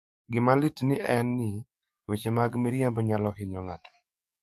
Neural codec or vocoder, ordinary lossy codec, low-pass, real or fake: codec, 44.1 kHz, 7.8 kbps, DAC; AAC, 96 kbps; 14.4 kHz; fake